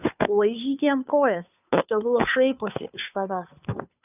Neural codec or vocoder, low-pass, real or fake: codec, 16 kHz, 2 kbps, FunCodec, trained on Chinese and English, 25 frames a second; 3.6 kHz; fake